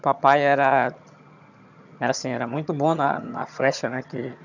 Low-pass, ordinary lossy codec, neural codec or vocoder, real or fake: 7.2 kHz; none; vocoder, 22.05 kHz, 80 mel bands, HiFi-GAN; fake